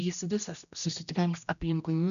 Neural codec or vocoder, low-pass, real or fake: codec, 16 kHz, 1 kbps, X-Codec, HuBERT features, trained on general audio; 7.2 kHz; fake